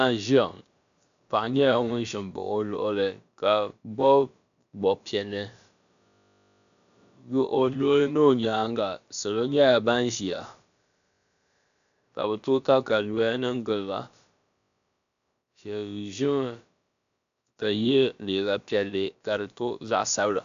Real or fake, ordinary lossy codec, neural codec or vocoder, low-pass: fake; AAC, 96 kbps; codec, 16 kHz, about 1 kbps, DyCAST, with the encoder's durations; 7.2 kHz